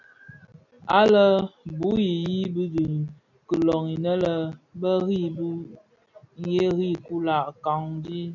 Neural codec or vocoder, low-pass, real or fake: none; 7.2 kHz; real